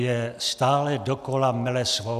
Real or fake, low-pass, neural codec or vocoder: real; 14.4 kHz; none